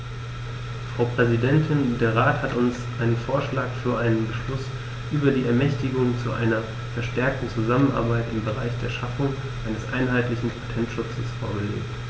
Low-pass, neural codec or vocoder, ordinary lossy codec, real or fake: none; none; none; real